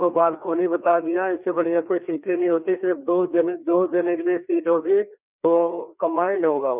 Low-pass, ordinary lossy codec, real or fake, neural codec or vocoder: 3.6 kHz; none; fake; codec, 16 kHz, 2 kbps, FreqCodec, larger model